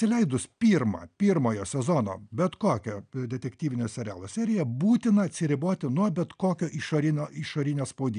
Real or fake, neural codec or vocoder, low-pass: real; none; 9.9 kHz